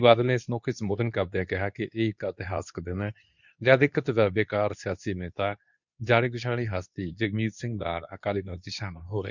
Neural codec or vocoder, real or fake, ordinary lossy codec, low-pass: codec, 24 kHz, 0.9 kbps, WavTokenizer, medium speech release version 2; fake; none; 7.2 kHz